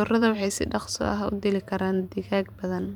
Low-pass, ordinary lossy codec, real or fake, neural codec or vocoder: 19.8 kHz; none; fake; vocoder, 44.1 kHz, 128 mel bands every 512 samples, BigVGAN v2